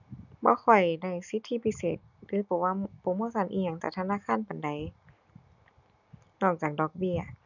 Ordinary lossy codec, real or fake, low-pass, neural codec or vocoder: none; real; 7.2 kHz; none